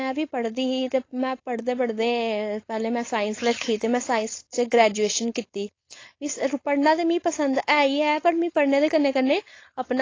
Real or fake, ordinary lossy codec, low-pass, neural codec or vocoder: fake; AAC, 32 kbps; 7.2 kHz; codec, 16 kHz, 4.8 kbps, FACodec